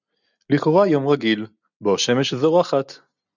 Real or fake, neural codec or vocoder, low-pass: real; none; 7.2 kHz